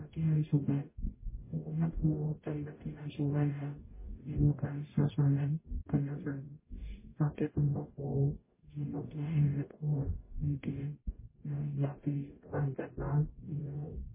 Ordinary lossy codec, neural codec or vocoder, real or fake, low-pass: MP3, 16 kbps; codec, 44.1 kHz, 0.9 kbps, DAC; fake; 3.6 kHz